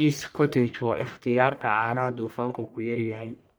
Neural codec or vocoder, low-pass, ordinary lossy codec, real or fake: codec, 44.1 kHz, 1.7 kbps, Pupu-Codec; none; none; fake